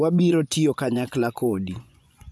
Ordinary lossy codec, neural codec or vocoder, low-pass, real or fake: none; vocoder, 24 kHz, 100 mel bands, Vocos; none; fake